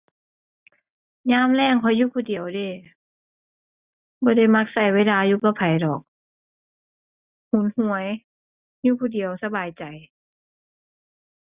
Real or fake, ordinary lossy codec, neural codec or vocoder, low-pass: real; Opus, 64 kbps; none; 3.6 kHz